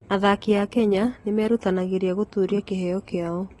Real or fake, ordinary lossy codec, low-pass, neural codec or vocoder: real; AAC, 32 kbps; 19.8 kHz; none